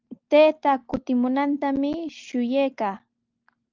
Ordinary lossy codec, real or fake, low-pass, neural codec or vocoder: Opus, 32 kbps; real; 7.2 kHz; none